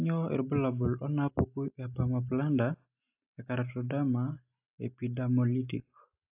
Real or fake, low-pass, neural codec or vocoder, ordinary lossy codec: real; 3.6 kHz; none; AAC, 32 kbps